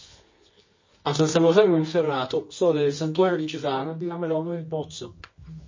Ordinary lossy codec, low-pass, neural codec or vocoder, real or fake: MP3, 32 kbps; 7.2 kHz; codec, 24 kHz, 0.9 kbps, WavTokenizer, medium music audio release; fake